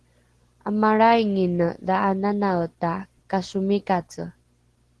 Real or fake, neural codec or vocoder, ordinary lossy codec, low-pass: real; none; Opus, 16 kbps; 10.8 kHz